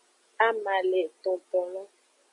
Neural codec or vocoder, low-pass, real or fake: none; 10.8 kHz; real